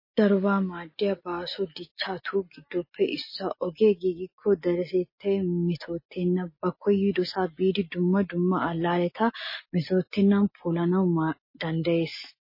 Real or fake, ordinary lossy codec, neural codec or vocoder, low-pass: real; MP3, 24 kbps; none; 5.4 kHz